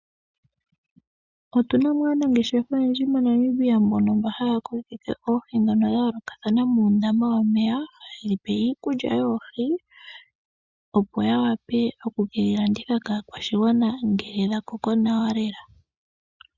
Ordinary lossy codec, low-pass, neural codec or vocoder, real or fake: Opus, 64 kbps; 7.2 kHz; none; real